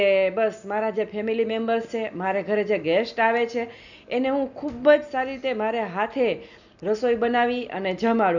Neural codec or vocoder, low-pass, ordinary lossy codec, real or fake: none; 7.2 kHz; none; real